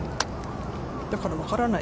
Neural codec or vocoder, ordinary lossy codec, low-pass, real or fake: none; none; none; real